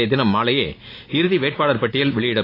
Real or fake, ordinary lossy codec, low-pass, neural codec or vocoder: real; AAC, 24 kbps; 5.4 kHz; none